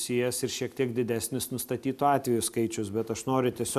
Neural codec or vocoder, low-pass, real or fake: none; 14.4 kHz; real